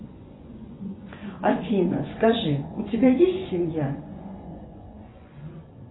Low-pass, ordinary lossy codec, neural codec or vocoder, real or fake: 7.2 kHz; AAC, 16 kbps; vocoder, 24 kHz, 100 mel bands, Vocos; fake